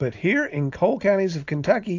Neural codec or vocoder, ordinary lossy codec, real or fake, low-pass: none; AAC, 48 kbps; real; 7.2 kHz